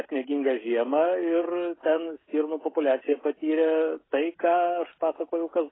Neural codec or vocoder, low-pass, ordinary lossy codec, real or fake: codec, 16 kHz, 16 kbps, FreqCodec, smaller model; 7.2 kHz; AAC, 16 kbps; fake